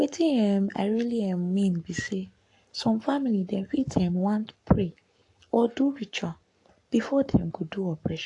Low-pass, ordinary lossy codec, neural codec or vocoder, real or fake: 10.8 kHz; AAC, 48 kbps; codec, 44.1 kHz, 7.8 kbps, Pupu-Codec; fake